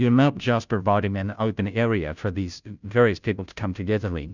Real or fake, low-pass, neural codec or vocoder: fake; 7.2 kHz; codec, 16 kHz, 0.5 kbps, FunCodec, trained on Chinese and English, 25 frames a second